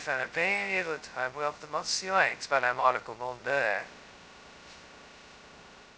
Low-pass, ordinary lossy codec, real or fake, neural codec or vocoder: none; none; fake; codec, 16 kHz, 0.2 kbps, FocalCodec